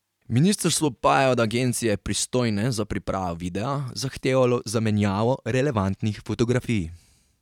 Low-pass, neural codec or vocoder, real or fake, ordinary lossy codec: 19.8 kHz; vocoder, 44.1 kHz, 128 mel bands every 512 samples, BigVGAN v2; fake; none